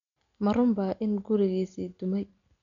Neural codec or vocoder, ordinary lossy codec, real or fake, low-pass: none; none; real; 7.2 kHz